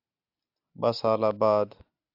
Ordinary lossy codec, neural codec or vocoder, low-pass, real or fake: AAC, 48 kbps; none; 5.4 kHz; real